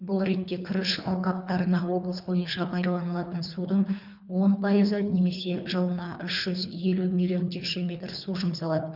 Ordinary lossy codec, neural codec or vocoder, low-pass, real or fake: none; codec, 24 kHz, 3 kbps, HILCodec; 5.4 kHz; fake